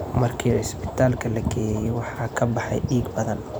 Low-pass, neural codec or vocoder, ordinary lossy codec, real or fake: none; vocoder, 44.1 kHz, 128 mel bands every 512 samples, BigVGAN v2; none; fake